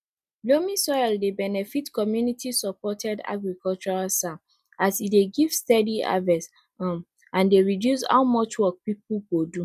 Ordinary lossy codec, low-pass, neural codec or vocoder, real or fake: none; 14.4 kHz; none; real